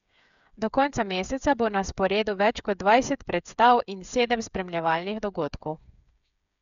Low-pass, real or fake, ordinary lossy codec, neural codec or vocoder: 7.2 kHz; fake; none; codec, 16 kHz, 8 kbps, FreqCodec, smaller model